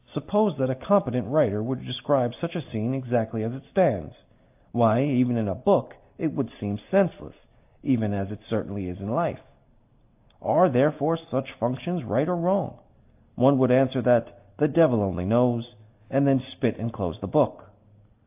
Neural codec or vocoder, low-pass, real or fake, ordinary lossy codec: none; 3.6 kHz; real; AAC, 32 kbps